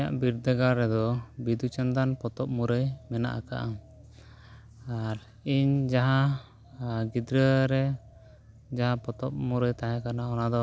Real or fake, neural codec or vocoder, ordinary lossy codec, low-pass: real; none; none; none